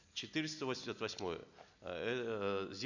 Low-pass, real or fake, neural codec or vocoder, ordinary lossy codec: 7.2 kHz; real; none; none